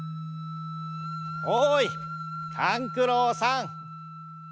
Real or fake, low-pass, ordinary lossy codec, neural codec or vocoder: real; none; none; none